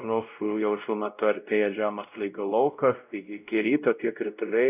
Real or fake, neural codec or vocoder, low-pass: fake; codec, 16 kHz, 0.5 kbps, X-Codec, WavLM features, trained on Multilingual LibriSpeech; 3.6 kHz